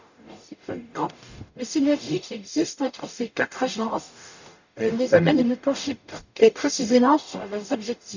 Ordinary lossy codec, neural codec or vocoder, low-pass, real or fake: none; codec, 44.1 kHz, 0.9 kbps, DAC; 7.2 kHz; fake